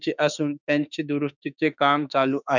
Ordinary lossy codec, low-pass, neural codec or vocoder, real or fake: none; 7.2 kHz; autoencoder, 48 kHz, 32 numbers a frame, DAC-VAE, trained on Japanese speech; fake